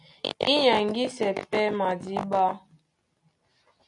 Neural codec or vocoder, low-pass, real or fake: none; 10.8 kHz; real